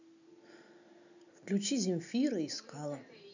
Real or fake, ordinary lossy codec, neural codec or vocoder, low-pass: real; none; none; 7.2 kHz